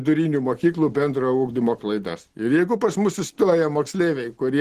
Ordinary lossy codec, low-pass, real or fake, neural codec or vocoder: Opus, 16 kbps; 14.4 kHz; real; none